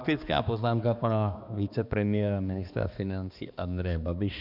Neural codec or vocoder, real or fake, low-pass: codec, 16 kHz, 2 kbps, X-Codec, HuBERT features, trained on balanced general audio; fake; 5.4 kHz